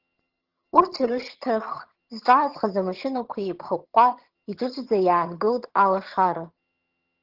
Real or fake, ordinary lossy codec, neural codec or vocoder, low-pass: fake; Opus, 16 kbps; vocoder, 22.05 kHz, 80 mel bands, HiFi-GAN; 5.4 kHz